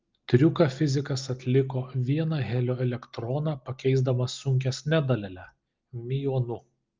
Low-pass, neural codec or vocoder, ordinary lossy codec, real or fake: 7.2 kHz; none; Opus, 24 kbps; real